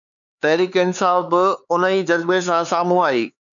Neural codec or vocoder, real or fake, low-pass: codec, 16 kHz, 4 kbps, X-Codec, HuBERT features, trained on LibriSpeech; fake; 7.2 kHz